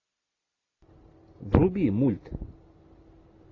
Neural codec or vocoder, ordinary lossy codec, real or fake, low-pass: none; AAC, 32 kbps; real; 7.2 kHz